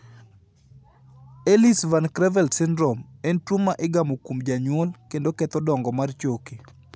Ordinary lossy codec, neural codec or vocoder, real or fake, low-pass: none; none; real; none